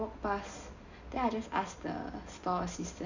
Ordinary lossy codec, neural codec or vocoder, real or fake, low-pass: none; none; real; 7.2 kHz